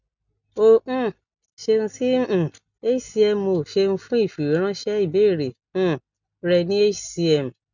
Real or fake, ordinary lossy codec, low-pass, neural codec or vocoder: real; none; 7.2 kHz; none